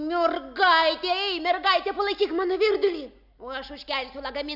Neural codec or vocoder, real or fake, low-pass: none; real; 5.4 kHz